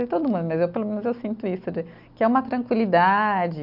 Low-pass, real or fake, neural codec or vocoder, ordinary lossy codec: 5.4 kHz; real; none; none